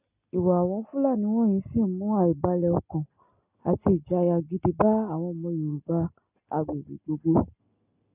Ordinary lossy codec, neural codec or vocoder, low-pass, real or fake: none; none; 3.6 kHz; real